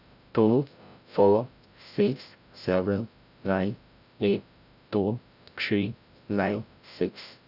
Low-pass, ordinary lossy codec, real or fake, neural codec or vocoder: 5.4 kHz; none; fake; codec, 16 kHz, 0.5 kbps, FreqCodec, larger model